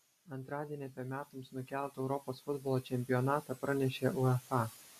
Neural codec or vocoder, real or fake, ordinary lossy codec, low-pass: none; real; MP3, 96 kbps; 14.4 kHz